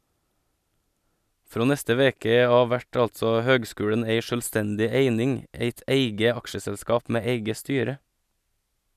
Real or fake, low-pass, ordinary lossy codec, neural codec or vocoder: real; 14.4 kHz; none; none